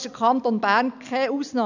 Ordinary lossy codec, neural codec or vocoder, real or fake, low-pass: none; none; real; 7.2 kHz